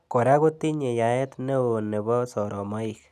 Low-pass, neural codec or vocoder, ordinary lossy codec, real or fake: 14.4 kHz; none; none; real